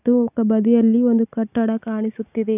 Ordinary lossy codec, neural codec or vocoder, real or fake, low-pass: none; none; real; 3.6 kHz